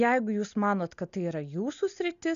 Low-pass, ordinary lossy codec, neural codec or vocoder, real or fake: 7.2 kHz; MP3, 96 kbps; none; real